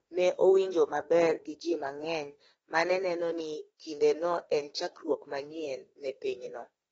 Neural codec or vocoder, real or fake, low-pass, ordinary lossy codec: autoencoder, 48 kHz, 32 numbers a frame, DAC-VAE, trained on Japanese speech; fake; 19.8 kHz; AAC, 24 kbps